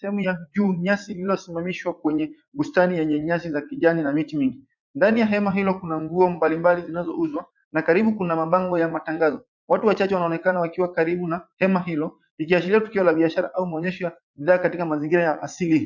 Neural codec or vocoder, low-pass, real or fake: vocoder, 44.1 kHz, 80 mel bands, Vocos; 7.2 kHz; fake